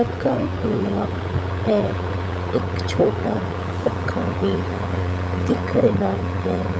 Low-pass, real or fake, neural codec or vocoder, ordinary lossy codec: none; fake; codec, 16 kHz, 8 kbps, FunCodec, trained on LibriTTS, 25 frames a second; none